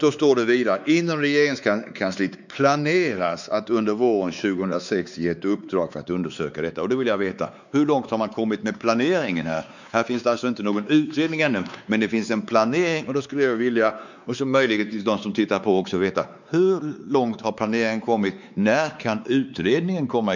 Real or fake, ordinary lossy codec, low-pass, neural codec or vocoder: fake; none; 7.2 kHz; codec, 16 kHz, 4 kbps, X-Codec, WavLM features, trained on Multilingual LibriSpeech